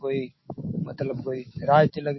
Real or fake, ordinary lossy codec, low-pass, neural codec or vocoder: fake; MP3, 24 kbps; 7.2 kHz; autoencoder, 48 kHz, 128 numbers a frame, DAC-VAE, trained on Japanese speech